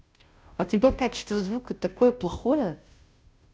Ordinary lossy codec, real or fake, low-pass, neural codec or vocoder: none; fake; none; codec, 16 kHz, 0.5 kbps, FunCodec, trained on Chinese and English, 25 frames a second